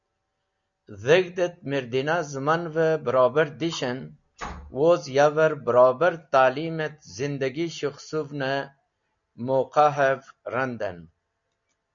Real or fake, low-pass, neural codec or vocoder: real; 7.2 kHz; none